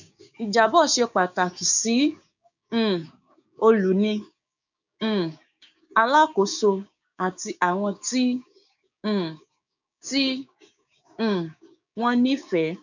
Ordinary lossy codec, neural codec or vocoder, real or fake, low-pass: none; codec, 16 kHz, 6 kbps, DAC; fake; 7.2 kHz